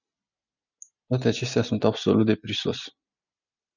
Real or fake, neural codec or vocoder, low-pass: real; none; 7.2 kHz